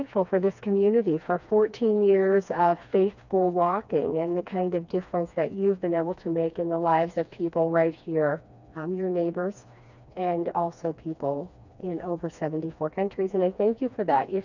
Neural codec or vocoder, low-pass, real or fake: codec, 16 kHz, 2 kbps, FreqCodec, smaller model; 7.2 kHz; fake